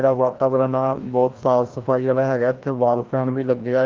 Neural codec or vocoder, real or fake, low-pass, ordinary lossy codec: codec, 16 kHz, 1 kbps, FreqCodec, larger model; fake; 7.2 kHz; Opus, 32 kbps